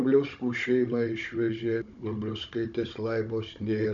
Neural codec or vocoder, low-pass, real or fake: codec, 16 kHz, 16 kbps, FunCodec, trained on Chinese and English, 50 frames a second; 7.2 kHz; fake